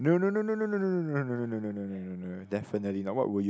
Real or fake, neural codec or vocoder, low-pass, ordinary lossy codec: real; none; none; none